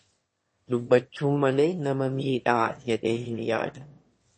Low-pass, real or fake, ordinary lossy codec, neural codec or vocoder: 9.9 kHz; fake; MP3, 32 kbps; autoencoder, 22.05 kHz, a latent of 192 numbers a frame, VITS, trained on one speaker